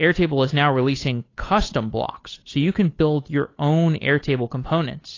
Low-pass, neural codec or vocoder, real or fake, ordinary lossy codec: 7.2 kHz; codec, 16 kHz, 8 kbps, FunCodec, trained on Chinese and English, 25 frames a second; fake; AAC, 32 kbps